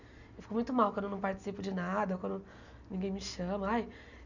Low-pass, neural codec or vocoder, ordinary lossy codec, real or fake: 7.2 kHz; none; none; real